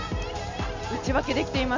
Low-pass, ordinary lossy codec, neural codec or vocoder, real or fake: 7.2 kHz; MP3, 64 kbps; none; real